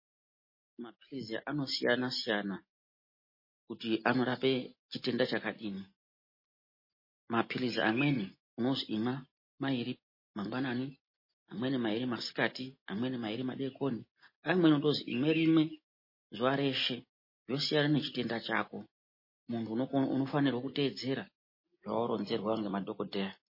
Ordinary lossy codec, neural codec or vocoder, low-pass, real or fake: MP3, 24 kbps; none; 5.4 kHz; real